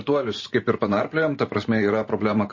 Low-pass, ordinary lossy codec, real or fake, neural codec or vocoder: 7.2 kHz; MP3, 32 kbps; fake; vocoder, 44.1 kHz, 128 mel bands every 512 samples, BigVGAN v2